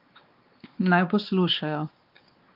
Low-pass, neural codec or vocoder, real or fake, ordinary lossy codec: 5.4 kHz; codec, 16 kHz, 2 kbps, X-Codec, WavLM features, trained on Multilingual LibriSpeech; fake; Opus, 32 kbps